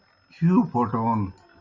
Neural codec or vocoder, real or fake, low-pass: none; real; 7.2 kHz